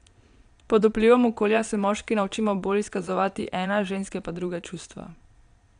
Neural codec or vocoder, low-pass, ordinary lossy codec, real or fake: vocoder, 22.05 kHz, 80 mel bands, Vocos; 9.9 kHz; none; fake